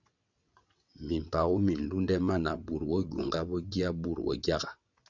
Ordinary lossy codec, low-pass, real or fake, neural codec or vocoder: Opus, 64 kbps; 7.2 kHz; fake; vocoder, 22.05 kHz, 80 mel bands, WaveNeXt